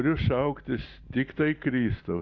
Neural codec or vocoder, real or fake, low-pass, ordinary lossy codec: none; real; 7.2 kHz; Opus, 64 kbps